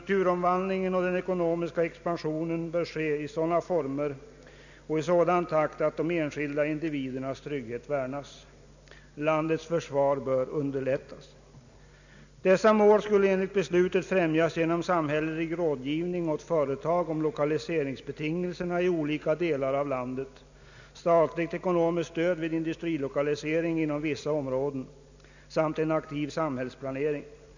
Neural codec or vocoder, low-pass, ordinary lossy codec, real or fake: none; 7.2 kHz; none; real